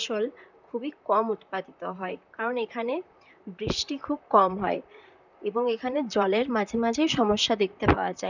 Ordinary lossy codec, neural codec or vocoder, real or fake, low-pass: none; vocoder, 44.1 kHz, 128 mel bands, Pupu-Vocoder; fake; 7.2 kHz